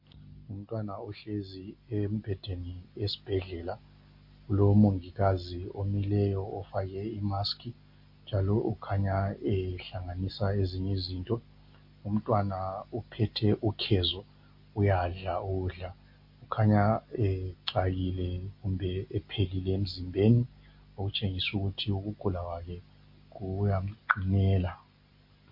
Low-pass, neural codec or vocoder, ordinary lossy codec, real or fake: 5.4 kHz; none; MP3, 32 kbps; real